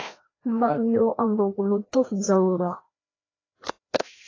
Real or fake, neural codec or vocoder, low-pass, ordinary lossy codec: fake; codec, 16 kHz, 1 kbps, FreqCodec, larger model; 7.2 kHz; AAC, 32 kbps